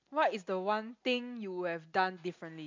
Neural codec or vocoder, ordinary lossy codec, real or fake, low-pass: none; MP3, 64 kbps; real; 7.2 kHz